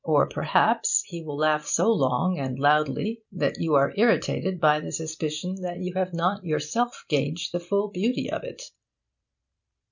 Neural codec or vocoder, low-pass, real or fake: none; 7.2 kHz; real